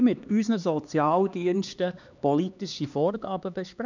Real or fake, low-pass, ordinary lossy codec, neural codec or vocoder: fake; 7.2 kHz; none; codec, 16 kHz, 2 kbps, X-Codec, HuBERT features, trained on LibriSpeech